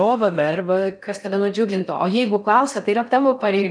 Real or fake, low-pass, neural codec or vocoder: fake; 9.9 kHz; codec, 16 kHz in and 24 kHz out, 0.6 kbps, FocalCodec, streaming, 4096 codes